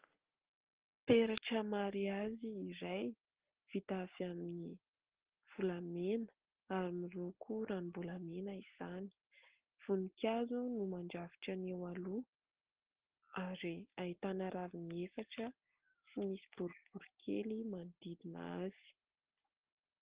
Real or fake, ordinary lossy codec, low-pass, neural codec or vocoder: real; Opus, 16 kbps; 3.6 kHz; none